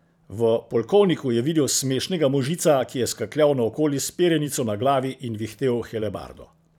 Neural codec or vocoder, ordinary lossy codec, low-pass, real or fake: vocoder, 44.1 kHz, 128 mel bands every 512 samples, BigVGAN v2; none; 19.8 kHz; fake